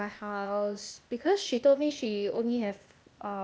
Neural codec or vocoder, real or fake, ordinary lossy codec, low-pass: codec, 16 kHz, 0.8 kbps, ZipCodec; fake; none; none